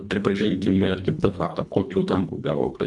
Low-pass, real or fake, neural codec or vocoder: 10.8 kHz; fake; codec, 24 kHz, 1.5 kbps, HILCodec